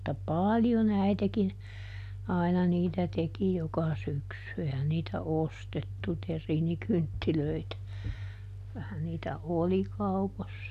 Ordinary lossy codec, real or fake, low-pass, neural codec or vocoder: none; real; 14.4 kHz; none